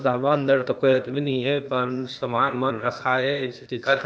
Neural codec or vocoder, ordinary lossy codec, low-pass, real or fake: codec, 16 kHz, 0.8 kbps, ZipCodec; none; none; fake